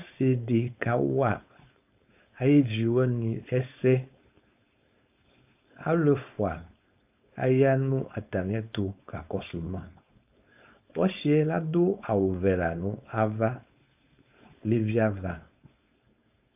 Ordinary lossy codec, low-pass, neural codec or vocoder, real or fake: AAC, 32 kbps; 3.6 kHz; codec, 16 kHz, 4.8 kbps, FACodec; fake